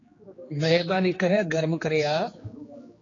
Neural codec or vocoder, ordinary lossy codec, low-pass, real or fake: codec, 16 kHz, 2 kbps, X-Codec, HuBERT features, trained on general audio; AAC, 32 kbps; 7.2 kHz; fake